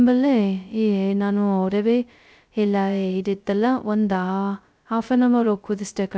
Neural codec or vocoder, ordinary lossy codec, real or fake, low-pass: codec, 16 kHz, 0.2 kbps, FocalCodec; none; fake; none